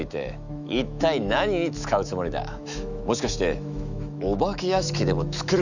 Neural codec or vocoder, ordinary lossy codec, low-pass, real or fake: autoencoder, 48 kHz, 128 numbers a frame, DAC-VAE, trained on Japanese speech; none; 7.2 kHz; fake